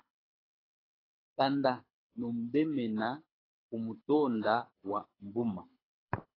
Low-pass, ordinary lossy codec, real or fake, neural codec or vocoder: 5.4 kHz; AAC, 24 kbps; fake; codec, 24 kHz, 6 kbps, HILCodec